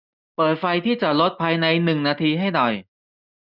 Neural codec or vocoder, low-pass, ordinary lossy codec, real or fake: none; 5.4 kHz; none; real